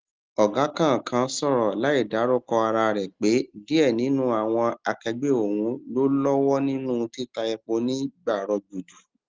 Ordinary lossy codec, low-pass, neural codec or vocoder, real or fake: Opus, 32 kbps; 7.2 kHz; none; real